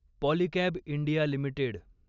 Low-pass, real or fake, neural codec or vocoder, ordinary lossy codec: 7.2 kHz; real; none; Opus, 64 kbps